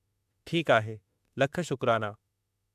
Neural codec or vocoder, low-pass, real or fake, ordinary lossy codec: autoencoder, 48 kHz, 32 numbers a frame, DAC-VAE, trained on Japanese speech; 14.4 kHz; fake; AAC, 96 kbps